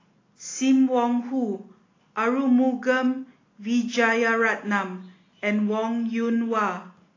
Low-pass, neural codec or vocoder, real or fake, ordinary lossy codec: 7.2 kHz; none; real; AAC, 32 kbps